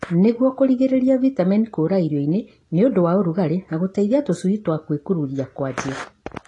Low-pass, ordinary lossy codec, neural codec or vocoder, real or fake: 10.8 kHz; AAC, 32 kbps; none; real